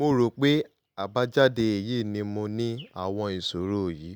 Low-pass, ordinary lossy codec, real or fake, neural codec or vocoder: none; none; real; none